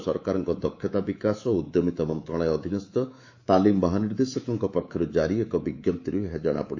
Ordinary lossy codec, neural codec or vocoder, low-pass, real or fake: AAC, 48 kbps; codec, 24 kHz, 3.1 kbps, DualCodec; 7.2 kHz; fake